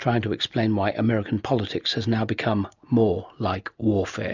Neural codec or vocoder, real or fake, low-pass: none; real; 7.2 kHz